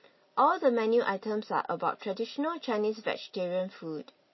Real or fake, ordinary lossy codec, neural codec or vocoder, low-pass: real; MP3, 24 kbps; none; 7.2 kHz